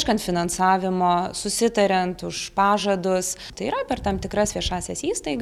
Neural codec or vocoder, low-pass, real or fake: none; 19.8 kHz; real